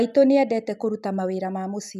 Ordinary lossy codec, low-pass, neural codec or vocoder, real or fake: none; 14.4 kHz; none; real